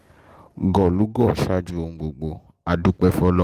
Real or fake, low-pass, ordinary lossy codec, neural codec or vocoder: real; 14.4 kHz; Opus, 24 kbps; none